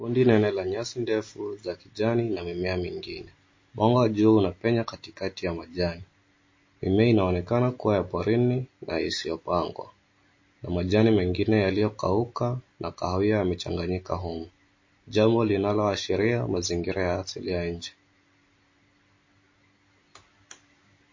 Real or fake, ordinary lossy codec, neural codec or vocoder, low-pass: real; MP3, 32 kbps; none; 7.2 kHz